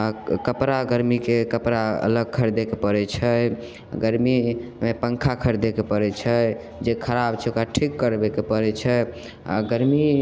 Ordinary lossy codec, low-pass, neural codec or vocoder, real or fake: none; none; none; real